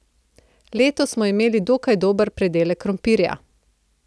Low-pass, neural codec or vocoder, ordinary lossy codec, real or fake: none; none; none; real